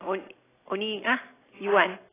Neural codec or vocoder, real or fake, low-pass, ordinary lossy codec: none; real; 3.6 kHz; AAC, 16 kbps